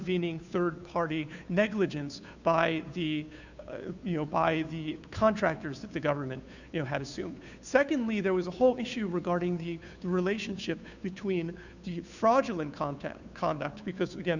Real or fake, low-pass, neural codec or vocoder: fake; 7.2 kHz; codec, 16 kHz, 2 kbps, FunCodec, trained on Chinese and English, 25 frames a second